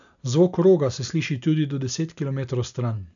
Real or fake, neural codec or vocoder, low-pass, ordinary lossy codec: real; none; 7.2 kHz; none